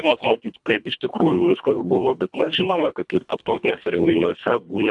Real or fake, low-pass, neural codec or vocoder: fake; 10.8 kHz; codec, 24 kHz, 1.5 kbps, HILCodec